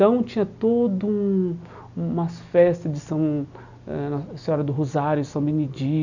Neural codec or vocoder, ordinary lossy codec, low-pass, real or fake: none; none; 7.2 kHz; real